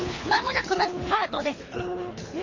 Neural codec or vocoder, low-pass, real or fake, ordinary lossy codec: codec, 24 kHz, 3 kbps, HILCodec; 7.2 kHz; fake; MP3, 32 kbps